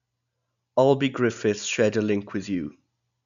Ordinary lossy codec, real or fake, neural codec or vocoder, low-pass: none; real; none; 7.2 kHz